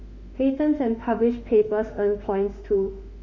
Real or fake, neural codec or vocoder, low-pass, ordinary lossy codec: fake; autoencoder, 48 kHz, 32 numbers a frame, DAC-VAE, trained on Japanese speech; 7.2 kHz; none